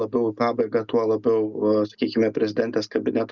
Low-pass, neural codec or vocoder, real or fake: 7.2 kHz; none; real